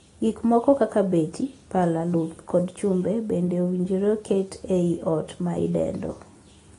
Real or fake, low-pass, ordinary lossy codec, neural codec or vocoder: real; 10.8 kHz; AAC, 32 kbps; none